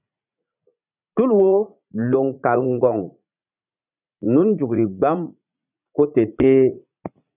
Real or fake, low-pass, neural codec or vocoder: fake; 3.6 kHz; vocoder, 44.1 kHz, 80 mel bands, Vocos